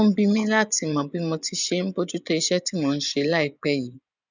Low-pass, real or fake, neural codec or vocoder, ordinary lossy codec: 7.2 kHz; fake; vocoder, 24 kHz, 100 mel bands, Vocos; none